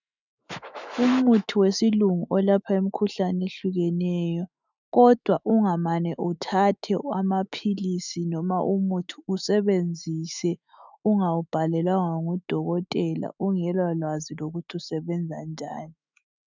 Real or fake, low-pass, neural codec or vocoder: fake; 7.2 kHz; autoencoder, 48 kHz, 128 numbers a frame, DAC-VAE, trained on Japanese speech